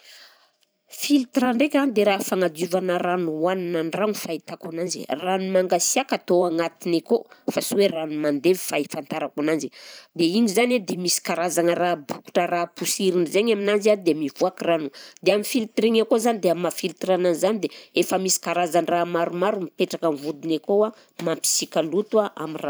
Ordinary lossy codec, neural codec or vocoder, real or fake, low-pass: none; vocoder, 44.1 kHz, 128 mel bands every 512 samples, BigVGAN v2; fake; none